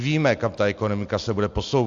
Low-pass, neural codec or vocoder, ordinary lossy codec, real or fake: 7.2 kHz; none; AAC, 64 kbps; real